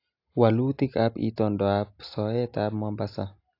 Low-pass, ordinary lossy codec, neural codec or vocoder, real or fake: 5.4 kHz; AAC, 32 kbps; none; real